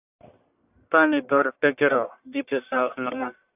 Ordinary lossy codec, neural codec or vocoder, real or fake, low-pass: AAC, 32 kbps; codec, 44.1 kHz, 1.7 kbps, Pupu-Codec; fake; 3.6 kHz